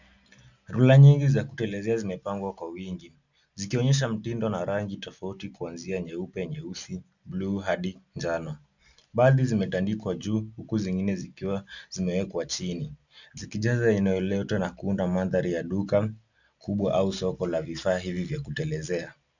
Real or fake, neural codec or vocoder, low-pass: real; none; 7.2 kHz